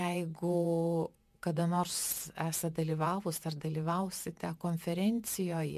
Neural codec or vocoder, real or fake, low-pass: vocoder, 48 kHz, 128 mel bands, Vocos; fake; 14.4 kHz